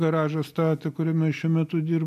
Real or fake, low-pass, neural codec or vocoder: real; 14.4 kHz; none